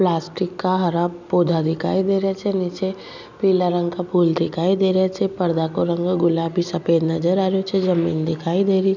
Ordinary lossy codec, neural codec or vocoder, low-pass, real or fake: none; none; 7.2 kHz; real